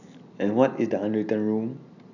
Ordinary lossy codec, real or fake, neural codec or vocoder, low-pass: none; real; none; 7.2 kHz